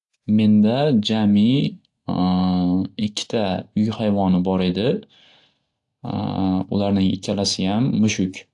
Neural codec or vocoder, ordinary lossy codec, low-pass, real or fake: none; AAC, 64 kbps; 10.8 kHz; real